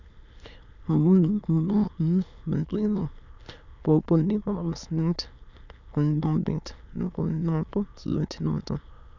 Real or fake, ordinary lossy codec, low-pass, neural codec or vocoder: fake; none; 7.2 kHz; autoencoder, 22.05 kHz, a latent of 192 numbers a frame, VITS, trained on many speakers